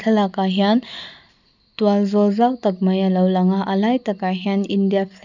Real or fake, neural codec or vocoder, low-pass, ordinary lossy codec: real; none; 7.2 kHz; none